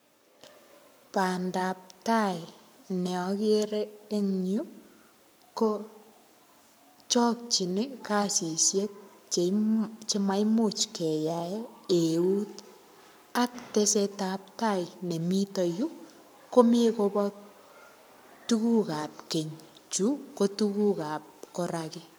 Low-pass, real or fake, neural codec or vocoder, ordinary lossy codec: none; fake; codec, 44.1 kHz, 7.8 kbps, Pupu-Codec; none